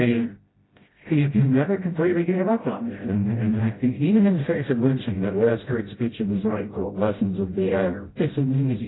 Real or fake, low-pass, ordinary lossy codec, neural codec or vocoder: fake; 7.2 kHz; AAC, 16 kbps; codec, 16 kHz, 0.5 kbps, FreqCodec, smaller model